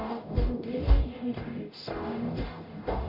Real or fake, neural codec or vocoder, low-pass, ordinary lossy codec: fake; codec, 44.1 kHz, 0.9 kbps, DAC; 5.4 kHz; MP3, 32 kbps